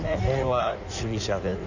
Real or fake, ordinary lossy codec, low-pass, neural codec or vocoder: fake; none; 7.2 kHz; codec, 16 kHz in and 24 kHz out, 1.1 kbps, FireRedTTS-2 codec